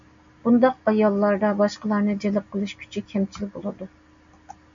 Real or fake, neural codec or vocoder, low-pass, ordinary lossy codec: real; none; 7.2 kHz; MP3, 64 kbps